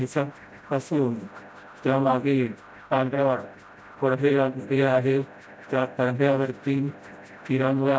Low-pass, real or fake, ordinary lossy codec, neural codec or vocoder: none; fake; none; codec, 16 kHz, 0.5 kbps, FreqCodec, smaller model